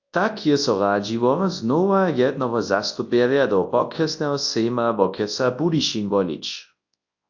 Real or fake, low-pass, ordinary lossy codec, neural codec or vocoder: fake; 7.2 kHz; AAC, 48 kbps; codec, 24 kHz, 0.9 kbps, WavTokenizer, large speech release